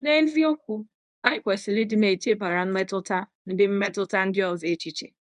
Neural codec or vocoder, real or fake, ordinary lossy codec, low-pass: codec, 24 kHz, 0.9 kbps, WavTokenizer, medium speech release version 1; fake; none; 10.8 kHz